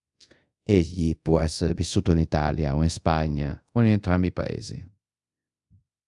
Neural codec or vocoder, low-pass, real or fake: codec, 24 kHz, 0.5 kbps, DualCodec; 10.8 kHz; fake